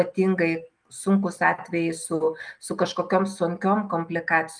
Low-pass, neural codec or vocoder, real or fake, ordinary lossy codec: 9.9 kHz; none; real; Opus, 32 kbps